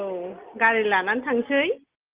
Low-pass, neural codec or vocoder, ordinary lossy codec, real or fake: 3.6 kHz; none; Opus, 32 kbps; real